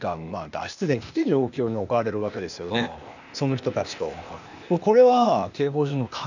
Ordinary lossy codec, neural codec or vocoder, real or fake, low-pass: none; codec, 16 kHz, 0.8 kbps, ZipCodec; fake; 7.2 kHz